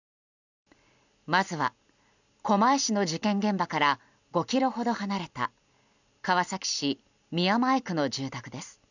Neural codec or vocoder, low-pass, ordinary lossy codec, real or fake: none; 7.2 kHz; none; real